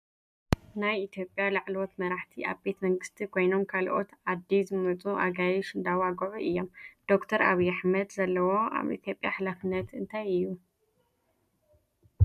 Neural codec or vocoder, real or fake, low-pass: none; real; 14.4 kHz